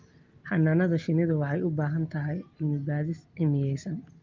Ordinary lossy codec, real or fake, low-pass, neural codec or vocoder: Opus, 24 kbps; real; 7.2 kHz; none